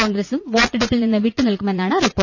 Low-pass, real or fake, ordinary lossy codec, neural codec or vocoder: 7.2 kHz; fake; none; vocoder, 44.1 kHz, 80 mel bands, Vocos